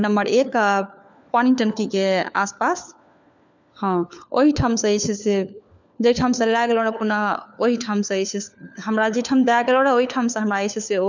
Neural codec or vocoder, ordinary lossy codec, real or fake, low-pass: codec, 16 kHz, 4 kbps, FunCodec, trained on LibriTTS, 50 frames a second; none; fake; 7.2 kHz